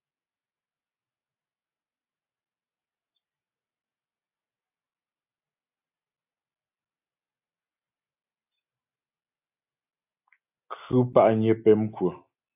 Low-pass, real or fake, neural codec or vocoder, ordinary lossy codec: 3.6 kHz; real; none; AAC, 32 kbps